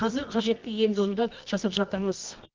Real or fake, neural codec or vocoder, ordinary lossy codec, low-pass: fake; codec, 24 kHz, 0.9 kbps, WavTokenizer, medium music audio release; Opus, 32 kbps; 7.2 kHz